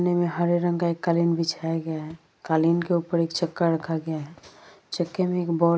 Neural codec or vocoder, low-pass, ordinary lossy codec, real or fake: none; none; none; real